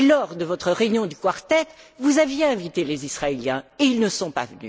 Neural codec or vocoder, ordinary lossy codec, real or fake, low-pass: none; none; real; none